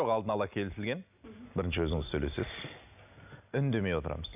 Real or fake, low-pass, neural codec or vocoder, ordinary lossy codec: real; 3.6 kHz; none; none